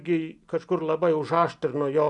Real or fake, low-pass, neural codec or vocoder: real; 10.8 kHz; none